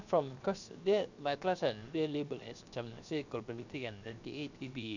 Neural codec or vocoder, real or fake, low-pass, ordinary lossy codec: codec, 16 kHz, 0.7 kbps, FocalCodec; fake; 7.2 kHz; none